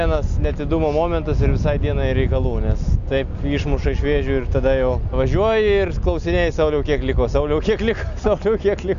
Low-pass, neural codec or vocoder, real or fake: 7.2 kHz; none; real